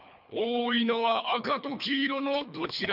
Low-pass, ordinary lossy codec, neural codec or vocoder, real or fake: 5.4 kHz; none; codec, 24 kHz, 3 kbps, HILCodec; fake